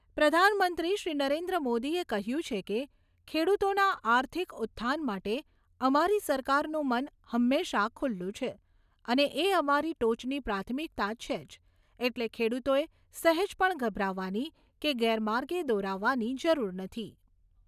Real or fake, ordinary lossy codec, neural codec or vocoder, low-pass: real; none; none; 14.4 kHz